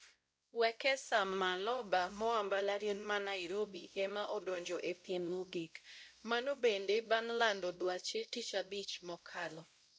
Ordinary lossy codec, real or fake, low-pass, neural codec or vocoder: none; fake; none; codec, 16 kHz, 0.5 kbps, X-Codec, WavLM features, trained on Multilingual LibriSpeech